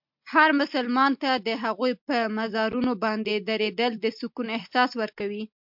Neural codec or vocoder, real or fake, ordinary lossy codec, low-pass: none; real; MP3, 48 kbps; 5.4 kHz